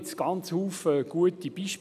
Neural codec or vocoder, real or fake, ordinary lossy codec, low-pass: none; real; none; 14.4 kHz